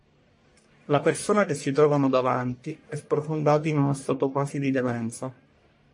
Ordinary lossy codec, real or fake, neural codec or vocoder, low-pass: MP3, 48 kbps; fake; codec, 44.1 kHz, 1.7 kbps, Pupu-Codec; 10.8 kHz